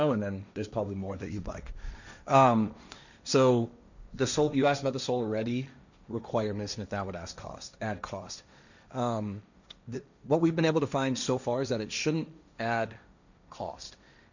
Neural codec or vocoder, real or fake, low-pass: codec, 16 kHz, 1.1 kbps, Voila-Tokenizer; fake; 7.2 kHz